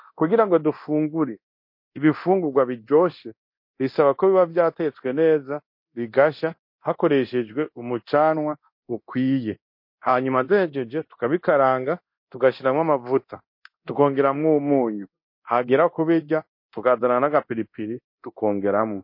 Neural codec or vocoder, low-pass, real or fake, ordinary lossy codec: codec, 24 kHz, 0.9 kbps, DualCodec; 5.4 kHz; fake; MP3, 32 kbps